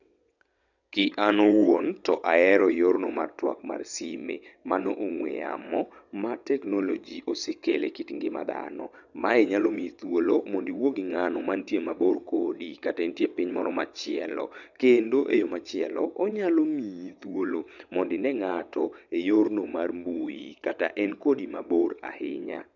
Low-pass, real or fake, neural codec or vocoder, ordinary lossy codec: 7.2 kHz; fake; vocoder, 22.05 kHz, 80 mel bands, WaveNeXt; none